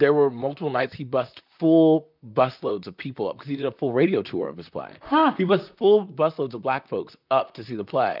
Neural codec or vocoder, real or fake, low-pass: vocoder, 44.1 kHz, 128 mel bands, Pupu-Vocoder; fake; 5.4 kHz